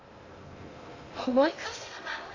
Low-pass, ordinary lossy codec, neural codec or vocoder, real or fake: 7.2 kHz; AAC, 32 kbps; codec, 16 kHz in and 24 kHz out, 0.6 kbps, FocalCodec, streaming, 2048 codes; fake